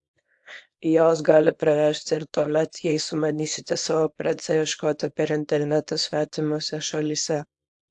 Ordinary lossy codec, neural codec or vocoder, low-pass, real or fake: AAC, 64 kbps; codec, 24 kHz, 0.9 kbps, WavTokenizer, small release; 10.8 kHz; fake